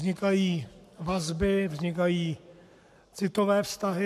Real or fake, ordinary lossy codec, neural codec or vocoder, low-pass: fake; MP3, 96 kbps; codec, 44.1 kHz, 7.8 kbps, Pupu-Codec; 14.4 kHz